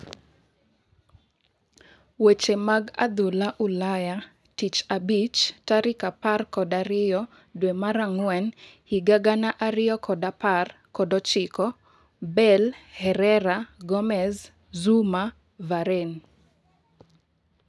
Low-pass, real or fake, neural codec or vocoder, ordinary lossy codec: none; real; none; none